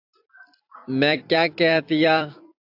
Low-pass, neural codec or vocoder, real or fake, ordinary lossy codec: 5.4 kHz; vocoder, 44.1 kHz, 128 mel bands every 256 samples, BigVGAN v2; fake; AAC, 48 kbps